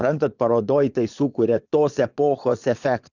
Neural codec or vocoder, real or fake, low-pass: vocoder, 22.05 kHz, 80 mel bands, Vocos; fake; 7.2 kHz